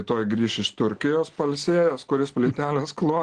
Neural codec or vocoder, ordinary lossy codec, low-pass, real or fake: none; Opus, 16 kbps; 9.9 kHz; real